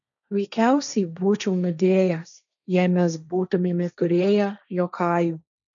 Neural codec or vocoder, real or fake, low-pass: codec, 16 kHz, 1.1 kbps, Voila-Tokenizer; fake; 7.2 kHz